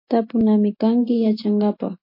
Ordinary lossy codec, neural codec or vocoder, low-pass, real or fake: MP3, 32 kbps; none; 5.4 kHz; real